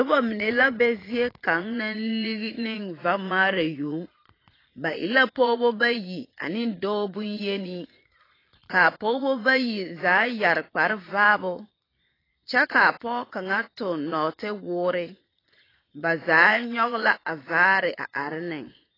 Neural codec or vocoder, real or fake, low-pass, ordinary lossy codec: vocoder, 22.05 kHz, 80 mel bands, Vocos; fake; 5.4 kHz; AAC, 24 kbps